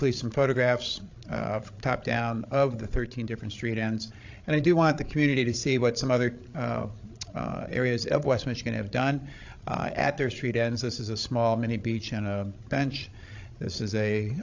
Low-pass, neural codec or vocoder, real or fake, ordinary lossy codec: 7.2 kHz; codec, 16 kHz, 16 kbps, FreqCodec, larger model; fake; AAC, 48 kbps